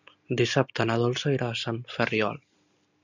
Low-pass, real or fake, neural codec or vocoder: 7.2 kHz; real; none